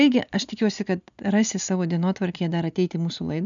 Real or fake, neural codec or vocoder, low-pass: real; none; 7.2 kHz